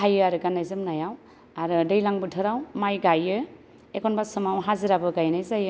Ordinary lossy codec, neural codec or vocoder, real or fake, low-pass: none; none; real; none